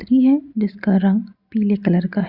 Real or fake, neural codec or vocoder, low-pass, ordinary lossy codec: real; none; 5.4 kHz; Opus, 64 kbps